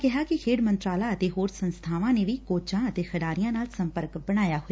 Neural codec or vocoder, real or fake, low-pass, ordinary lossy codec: none; real; none; none